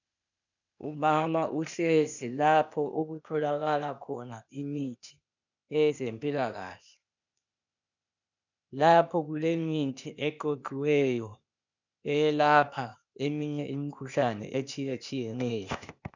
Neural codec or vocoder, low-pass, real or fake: codec, 16 kHz, 0.8 kbps, ZipCodec; 7.2 kHz; fake